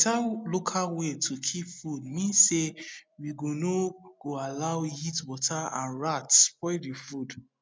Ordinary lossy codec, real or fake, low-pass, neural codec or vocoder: none; real; none; none